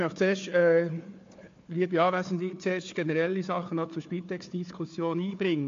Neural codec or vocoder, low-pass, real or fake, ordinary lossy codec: codec, 16 kHz, 4 kbps, FunCodec, trained on Chinese and English, 50 frames a second; 7.2 kHz; fake; AAC, 48 kbps